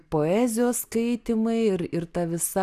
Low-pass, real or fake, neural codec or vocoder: 14.4 kHz; real; none